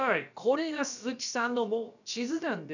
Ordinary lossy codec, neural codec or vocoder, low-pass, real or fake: none; codec, 16 kHz, about 1 kbps, DyCAST, with the encoder's durations; 7.2 kHz; fake